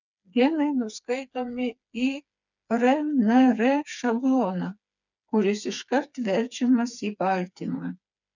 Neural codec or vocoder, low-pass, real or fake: codec, 16 kHz, 4 kbps, FreqCodec, smaller model; 7.2 kHz; fake